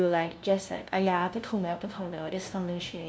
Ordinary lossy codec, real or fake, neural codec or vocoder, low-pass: none; fake; codec, 16 kHz, 0.5 kbps, FunCodec, trained on LibriTTS, 25 frames a second; none